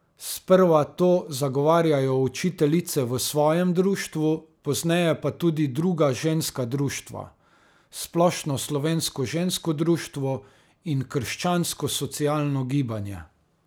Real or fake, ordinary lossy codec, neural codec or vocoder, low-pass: real; none; none; none